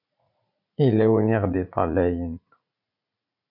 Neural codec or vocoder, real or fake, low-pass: vocoder, 44.1 kHz, 80 mel bands, Vocos; fake; 5.4 kHz